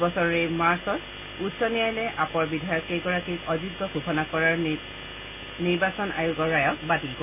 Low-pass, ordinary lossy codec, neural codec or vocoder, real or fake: 3.6 kHz; none; none; real